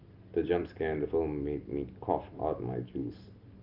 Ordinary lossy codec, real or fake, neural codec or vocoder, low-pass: Opus, 24 kbps; real; none; 5.4 kHz